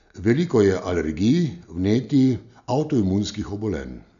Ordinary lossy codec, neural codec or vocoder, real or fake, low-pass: none; none; real; 7.2 kHz